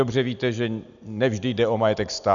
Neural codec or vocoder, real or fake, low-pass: none; real; 7.2 kHz